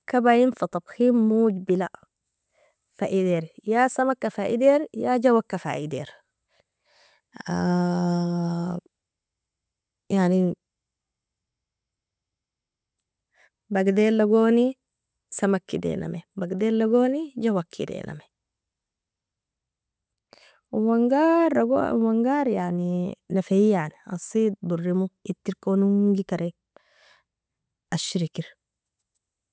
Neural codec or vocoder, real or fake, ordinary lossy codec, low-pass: none; real; none; none